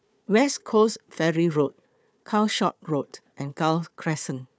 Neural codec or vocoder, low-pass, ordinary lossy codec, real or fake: codec, 16 kHz, 4 kbps, FunCodec, trained on Chinese and English, 50 frames a second; none; none; fake